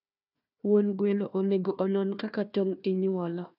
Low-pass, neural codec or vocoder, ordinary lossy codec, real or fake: 5.4 kHz; codec, 16 kHz, 1 kbps, FunCodec, trained on Chinese and English, 50 frames a second; none; fake